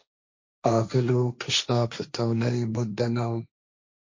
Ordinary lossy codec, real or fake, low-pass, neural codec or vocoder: MP3, 48 kbps; fake; 7.2 kHz; codec, 16 kHz, 1.1 kbps, Voila-Tokenizer